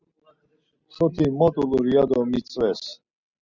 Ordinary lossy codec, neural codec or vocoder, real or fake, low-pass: Opus, 64 kbps; none; real; 7.2 kHz